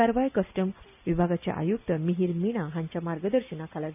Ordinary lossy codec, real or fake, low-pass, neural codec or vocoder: none; real; 3.6 kHz; none